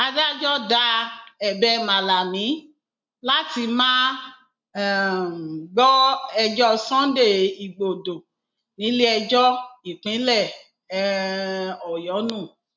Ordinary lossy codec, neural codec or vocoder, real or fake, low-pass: MP3, 64 kbps; none; real; 7.2 kHz